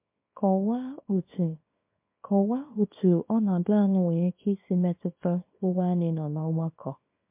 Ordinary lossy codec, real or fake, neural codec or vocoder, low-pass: MP3, 32 kbps; fake; codec, 24 kHz, 0.9 kbps, WavTokenizer, small release; 3.6 kHz